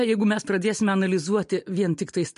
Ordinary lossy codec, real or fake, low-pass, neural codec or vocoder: MP3, 48 kbps; real; 10.8 kHz; none